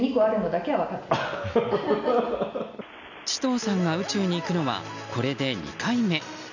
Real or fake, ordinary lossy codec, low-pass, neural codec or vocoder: real; none; 7.2 kHz; none